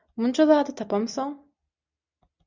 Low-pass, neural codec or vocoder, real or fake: 7.2 kHz; none; real